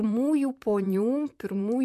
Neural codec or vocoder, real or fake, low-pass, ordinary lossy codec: vocoder, 44.1 kHz, 128 mel bands, Pupu-Vocoder; fake; 14.4 kHz; AAC, 96 kbps